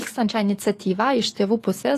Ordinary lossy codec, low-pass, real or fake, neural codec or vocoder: AAC, 48 kbps; 14.4 kHz; fake; codec, 44.1 kHz, 7.8 kbps, DAC